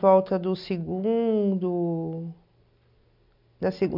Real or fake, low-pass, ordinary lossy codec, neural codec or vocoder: real; 5.4 kHz; none; none